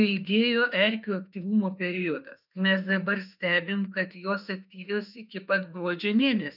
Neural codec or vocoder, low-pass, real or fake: autoencoder, 48 kHz, 32 numbers a frame, DAC-VAE, trained on Japanese speech; 5.4 kHz; fake